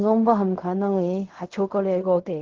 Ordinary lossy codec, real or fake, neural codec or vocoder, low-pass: Opus, 16 kbps; fake; codec, 16 kHz in and 24 kHz out, 0.4 kbps, LongCat-Audio-Codec, fine tuned four codebook decoder; 7.2 kHz